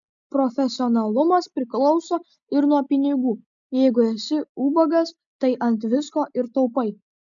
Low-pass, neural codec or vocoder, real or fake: 7.2 kHz; none; real